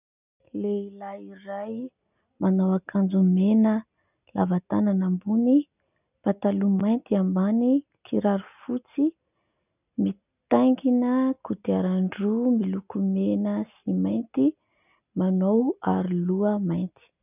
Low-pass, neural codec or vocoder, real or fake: 3.6 kHz; none; real